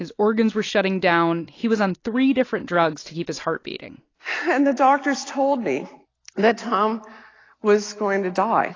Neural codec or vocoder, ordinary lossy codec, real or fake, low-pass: none; AAC, 32 kbps; real; 7.2 kHz